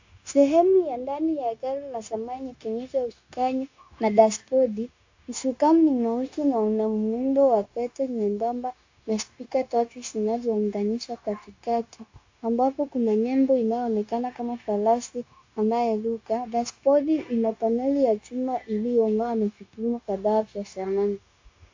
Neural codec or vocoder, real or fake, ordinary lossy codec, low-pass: codec, 16 kHz, 0.9 kbps, LongCat-Audio-Codec; fake; AAC, 48 kbps; 7.2 kHz